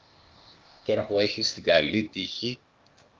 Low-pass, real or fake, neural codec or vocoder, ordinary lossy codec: 7.2 kHz; fake; codec, 16 kHz, 0.8 kbps, ZipCodec; Opus, 24 kbps